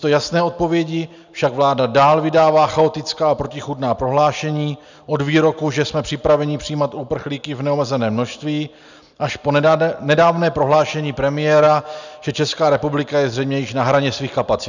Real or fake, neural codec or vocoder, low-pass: real; none; 7.2 kHz